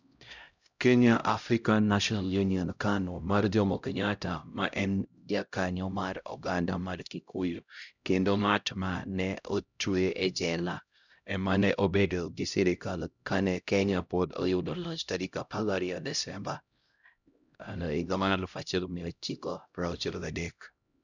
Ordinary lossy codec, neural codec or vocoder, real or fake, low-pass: none; codec, 16 kHz, 0.5 kbps, X-Codec, HuBERT features, trained on LibriSpeech; fake; 7.2 kHz